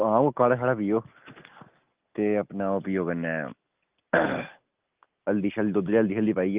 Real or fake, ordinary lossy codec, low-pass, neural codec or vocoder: real; Opus, 24 kbps; 3.6 kHz; none